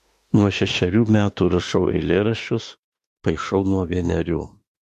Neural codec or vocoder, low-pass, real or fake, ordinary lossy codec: autoencoder, 48 kHz, 32 numbers a frame, DAC-VAE, trained on Japanese speech; 14.4 kHz; fake; MP3, 64 kbps